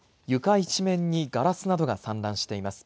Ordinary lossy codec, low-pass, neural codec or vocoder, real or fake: none; none; none; real